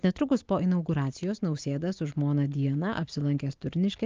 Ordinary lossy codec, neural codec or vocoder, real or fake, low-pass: Opus, 24 kbps; none; real; 7.2 kHz